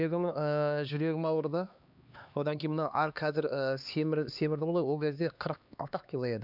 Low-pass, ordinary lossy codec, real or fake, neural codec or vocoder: 5.4 kHz; none; fake; codec, 16 kHz, 2 kbps, X-Codec, HuBERT features, trained on LibriSpeech